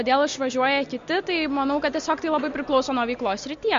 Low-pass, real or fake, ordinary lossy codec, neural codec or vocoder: 7.2 kHz; real; MP3, 48 kbps; none